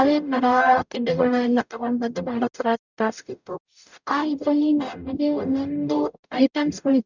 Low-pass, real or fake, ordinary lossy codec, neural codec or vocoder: 7.2 kHz; fake; none; codec, 44.1 kHz, 0.9 kbps, DAC